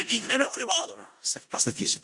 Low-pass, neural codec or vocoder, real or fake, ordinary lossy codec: 10.8 kHz; codec, 16 kHz in and 24 kHz out, 0.4 kbps, LongCat-Audio-Codec, four codebook decoder; fake; Opus, 64 kbps